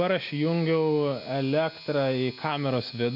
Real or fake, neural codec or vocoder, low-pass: real; none; 5.4 kHz